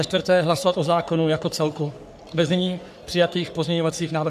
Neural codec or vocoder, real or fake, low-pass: codec, 44.1 kHz, 3.4 kbps, Pupu-Codec; fake; 14.4 kHz